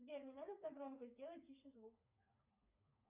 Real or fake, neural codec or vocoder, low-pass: fake; codec, 16 kHz, 4 kbps, FreqCodec, smaller model; 3.6 kHz